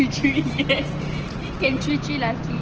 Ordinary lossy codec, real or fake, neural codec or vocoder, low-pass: Opus, 16 kbps; real; none; 7.2 kHz